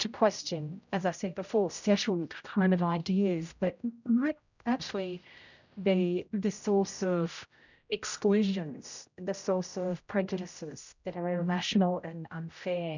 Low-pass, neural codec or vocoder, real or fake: 7.2 kHz; codec, 16 kHz, 0.5 kbps, X-Codec, HuBERT features, trained on general audio; fake